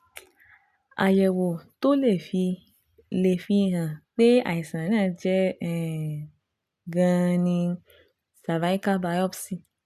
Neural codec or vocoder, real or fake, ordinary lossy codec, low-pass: none; real; none; 14.4 kHz